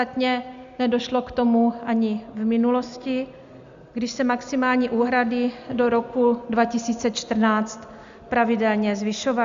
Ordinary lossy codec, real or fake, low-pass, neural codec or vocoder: Opus, 64 kbps; real; 7.2 kHz; none